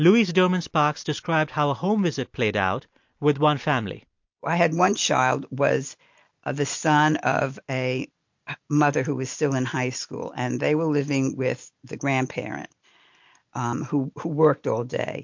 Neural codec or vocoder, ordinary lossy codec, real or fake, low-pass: none; MP3, 48 kbps; real; 7.2 kHz